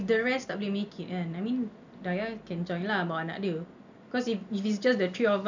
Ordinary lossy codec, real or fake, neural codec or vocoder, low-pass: none; real; none; 7.2 kHz